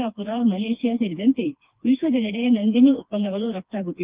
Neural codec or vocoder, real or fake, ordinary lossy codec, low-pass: codec, 16 kHz, 2 kbps, FreqCodec, smaller model; fake; Opus, 32 kbps; 3.6 kHz